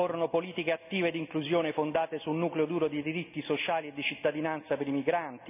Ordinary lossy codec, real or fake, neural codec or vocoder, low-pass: none; real; none; 3.6 kHz